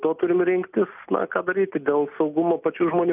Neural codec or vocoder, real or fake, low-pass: none; real; 3.6 kHz